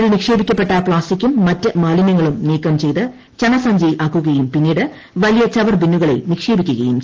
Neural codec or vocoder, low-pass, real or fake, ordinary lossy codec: none; 7.2 kHz; real; Opus, 16 kbps